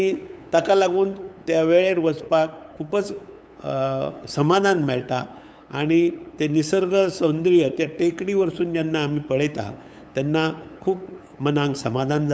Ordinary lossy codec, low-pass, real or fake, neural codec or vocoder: none; none; fake; codec, 16 kHz, 8 kbps, FunCodec, trained on LibriTTS, 25 frames a second